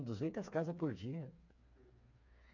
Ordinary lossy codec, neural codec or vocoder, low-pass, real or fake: none; codec, 16 kHz, 4 kbps, FreqCodec, smaller model; 7.2 kHz; fake